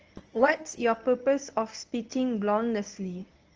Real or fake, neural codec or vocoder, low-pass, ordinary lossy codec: fake; codec, 24 kHz, 0.9 kbps, WavTokenizer, medium speech release version 1; 7.2 kHz; Opus, 24 kbps